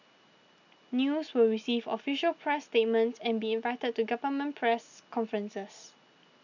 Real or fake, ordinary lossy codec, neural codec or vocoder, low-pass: real; none; none; 7.2 kHz